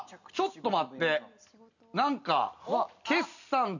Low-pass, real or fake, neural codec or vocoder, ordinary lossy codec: 7.2 kHz; real; none; none